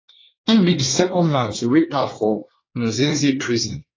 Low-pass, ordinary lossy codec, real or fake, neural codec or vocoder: 7.2 kHz; AAC, 32 kbps; fake; codec, 24 kHz, 1 kbps, SNAC